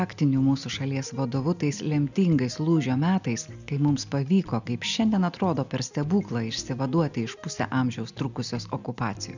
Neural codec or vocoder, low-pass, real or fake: none; 7.2 kHz; real